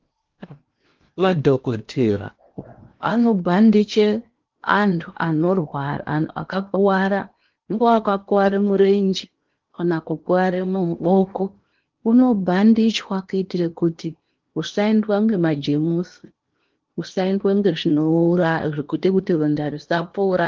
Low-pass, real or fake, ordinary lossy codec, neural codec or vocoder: 7.2 kHz; fake; Opus, 32 kbps; codec, 16 kHz in and 24 kHz out, 0.8 kbps, FocalCodec, streaming, 65536 codes